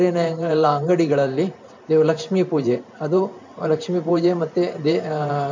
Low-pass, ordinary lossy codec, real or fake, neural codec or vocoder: 7.2 kHz; MP3, 64 kbps; fake; vocoder, 44.1 kHz, 128 mel bands every 512 samples, BigVGAN v2